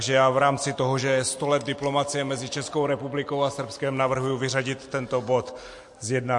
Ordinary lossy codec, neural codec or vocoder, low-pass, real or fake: MP3, 48 kbps; none; 10.8 kHz; real